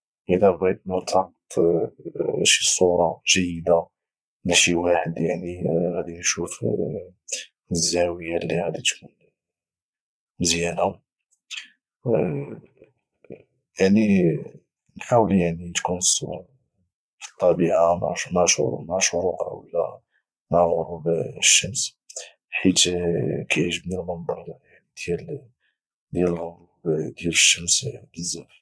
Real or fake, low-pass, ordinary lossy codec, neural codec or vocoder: fake; none; none; vocoder, 22.05 kHz, 80 mel bands, Vocos